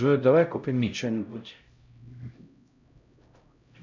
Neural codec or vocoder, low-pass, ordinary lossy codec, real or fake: codec, 16 kHz, 0.5 kbps, X-Codec, HuBERT features, trained on LibriSpeech; 7.2 kHz; MP3, 64 kbps; fake